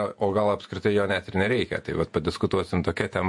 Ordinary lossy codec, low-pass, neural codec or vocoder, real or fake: MP3, 48 kbps; 10.8 kHz; vocoder, 48 kHz, 128 mel bands, Vocos; fake